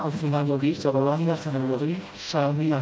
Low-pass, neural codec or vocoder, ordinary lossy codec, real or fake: none; codec, 16 kHz, 0.5 kbps, FreqCodec, smaller model; none; fake